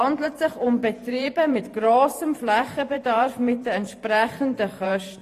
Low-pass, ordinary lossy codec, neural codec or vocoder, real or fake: 14.4 kHz; AAC, 48 kbps; vocoder, 44.1 kHz, 128 mel bands every 512 samples, BigVGAN v2; fake